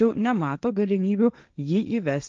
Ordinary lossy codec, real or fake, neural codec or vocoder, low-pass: Opus, 24 kbps; fake; codec, 16 kHz, 1.1 kbps, Voila-Tokenizer; 7.2 kHz